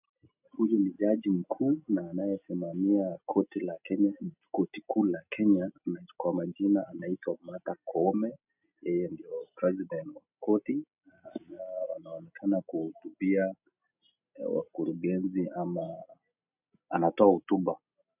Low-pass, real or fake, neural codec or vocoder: 3.6 kHz; real; none